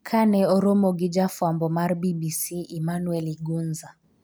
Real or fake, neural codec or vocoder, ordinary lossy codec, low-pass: real; none; none; none